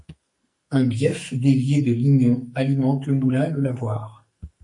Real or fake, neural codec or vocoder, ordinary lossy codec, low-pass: fake; codec, 44.1 kHz, 2.6 kbps, SNAC; MP3, 48 kbps; 10.8 kHz